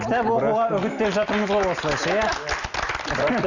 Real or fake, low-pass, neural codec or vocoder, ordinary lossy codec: real; 7.2 kHz; none; none